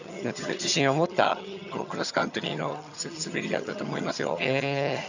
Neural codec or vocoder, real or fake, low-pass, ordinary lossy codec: vocoder, 22.05 kHz, 80 mel bands, HiFi-GAN; fake; 7.2 kHz; none